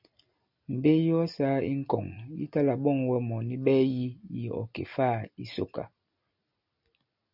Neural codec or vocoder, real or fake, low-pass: none; real; 5.4 kHz